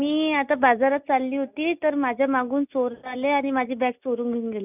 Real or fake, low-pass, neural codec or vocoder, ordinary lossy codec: real; 3.6 kHz; none; none